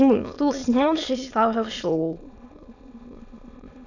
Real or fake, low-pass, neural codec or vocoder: fake; 7.2 kHz; autoencoder, 22.05 kHz, a latent of 192 numbers a frame, VITS, trained on many speakers